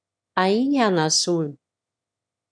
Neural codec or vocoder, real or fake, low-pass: autoencoder, 22.05 kHz, a latent of 192 numbers a frame, VITS, trained on one speaker; fake; 9.9 kHz